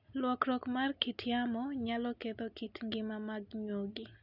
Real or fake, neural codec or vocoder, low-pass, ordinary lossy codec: real; none; 5.4 kHz; none